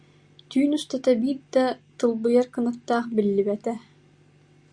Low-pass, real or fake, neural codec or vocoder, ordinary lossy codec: 9.9 kHz; real; none; Opus, 64 kbps